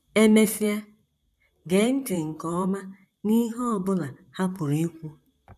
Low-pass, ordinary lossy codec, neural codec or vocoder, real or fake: 14.4 kHz; none; vocoder, 44.1 kHz, 128 mel bands, Pupu-Vocoder; fake